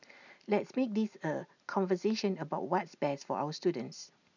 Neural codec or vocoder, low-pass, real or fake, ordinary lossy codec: none; 7.2 kHz; real; none